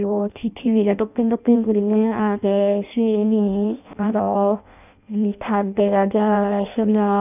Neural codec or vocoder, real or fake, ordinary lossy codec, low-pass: codec, 16 kHz in and 24 kHz out, 0.6 kbps, FireRedTTS-2 codec; fake; none; 3.6 kHz